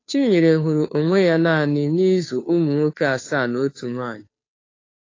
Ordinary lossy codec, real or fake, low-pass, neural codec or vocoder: AAC, 32 kbps; fake; 7.2 kHz; codec, 16 kHz, 2 kbps, FunCodec, trained on Chinese and English, 25 frames a second